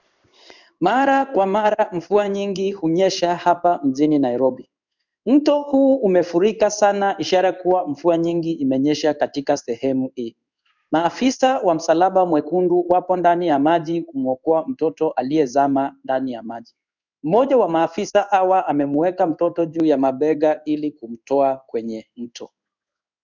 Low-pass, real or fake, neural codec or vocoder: 7.2 kHz; fake; codec, 16 kHz in and 24 kHz out, 1 kbps, XY-Tokenizer